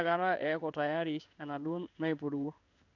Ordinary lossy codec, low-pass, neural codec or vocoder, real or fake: none; 7.2 kHz; codec, 16 kHz in and 24 kHz out, 1 kbps, XY-Tokenizer; fake